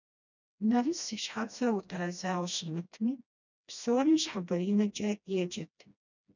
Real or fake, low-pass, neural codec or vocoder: fake; 7.2 kHz; codec, 16 kHz, 1 kbps, FreqCodec, smaller model